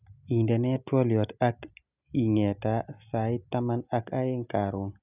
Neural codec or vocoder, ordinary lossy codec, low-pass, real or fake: none; none; 3.6 kHz; real